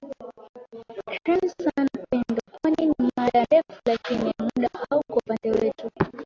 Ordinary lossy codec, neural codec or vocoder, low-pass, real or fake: AAC, 48 kbps; none; 7.2 kHz; real